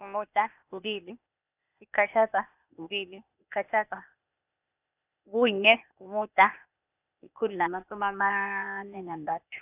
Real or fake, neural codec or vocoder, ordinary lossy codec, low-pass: fake; codec, 16 kHz, 0.8 kbps, ZipCodec; none; 3.6 kHz